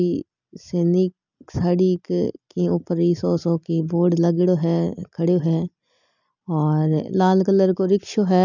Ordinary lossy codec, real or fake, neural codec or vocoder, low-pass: none; real; none; 7.2 kHz